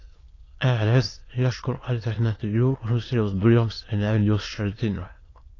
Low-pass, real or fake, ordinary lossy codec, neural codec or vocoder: 7.2 kHz; fake; AAC, 32 kbps; autoencoder, 22.05 kHz, a latent of 192 numbers a frame, VITS, trained on many speakers